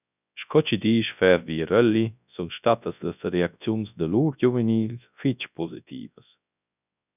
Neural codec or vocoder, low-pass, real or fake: codec, 24 kHz, 0.9 kbps, WavTokenizer, large speech release; 3.6 kHz; fake